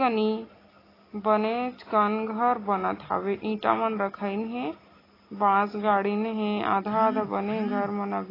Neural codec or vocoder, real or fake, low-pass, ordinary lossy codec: none; real; 5.4 kHz; AAC, 24 kbps